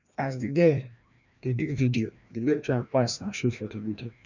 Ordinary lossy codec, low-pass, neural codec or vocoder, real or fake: none; 7.2 kHz; codec, 16 kHz, 1 kbps, FreqCodec, larger model; fake